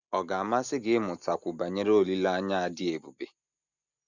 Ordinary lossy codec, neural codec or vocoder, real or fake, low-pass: none; none; real; 7.2 kHz